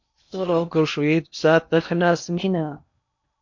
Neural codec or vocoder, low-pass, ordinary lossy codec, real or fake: codec, 16 kHz in and 24 kHz out, 0.8 kbps, FocalCodec, streaming, 65536 codes; 7.2 kHz; MP3, 48 kbps; fake